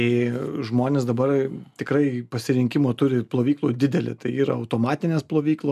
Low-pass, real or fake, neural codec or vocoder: 14.4 kHz; real; none